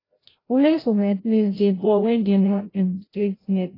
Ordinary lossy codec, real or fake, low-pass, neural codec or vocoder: AAC, 24 kbps; fake; 5.4 kHz; codec, 16 kHz, 0.5 kbps, FreqCodec, larger model